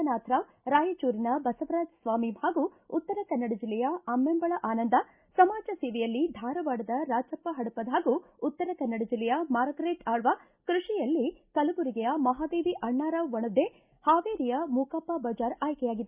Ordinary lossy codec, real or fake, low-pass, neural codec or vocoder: Opus, 64 kbps; real; 3.6 kHz; none